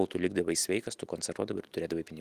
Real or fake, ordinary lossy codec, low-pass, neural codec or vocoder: real; Opus, 24 kbps; 14.4 kHz; none